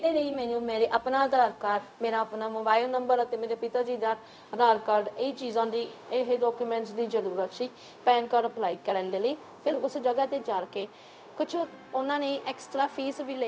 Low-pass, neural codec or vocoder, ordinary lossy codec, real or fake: none; codec, 16 kHz, 0.4 kbps, LongCat-Audio-Codec; none; fake